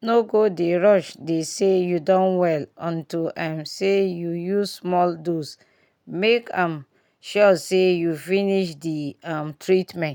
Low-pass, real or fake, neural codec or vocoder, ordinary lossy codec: 19.8 kHz; real; none; none